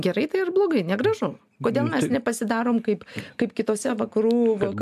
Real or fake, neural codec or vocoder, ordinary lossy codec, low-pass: real; none; AAC, 96 kbps; 14.4 kHz